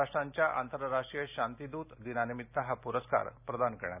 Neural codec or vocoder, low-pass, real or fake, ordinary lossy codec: none; 3.6 kHz; real; none